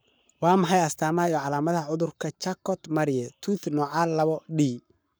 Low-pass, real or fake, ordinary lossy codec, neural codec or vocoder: none; fake; none; codec, 44.1 kHz, 7.8 kbps, Pupu-Codec